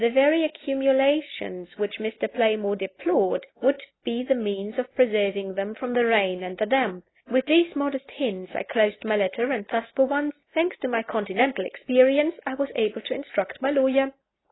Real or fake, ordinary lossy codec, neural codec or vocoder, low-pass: real; AAC, 16 kbps; none; 7.2 kHz